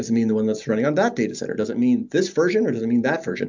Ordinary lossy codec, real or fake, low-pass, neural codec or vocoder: MP3, 64 kbps; real; 7.2 kHz; none